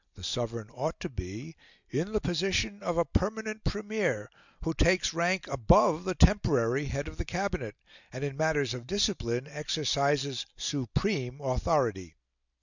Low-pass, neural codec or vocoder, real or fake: 7.2 kHz; none; real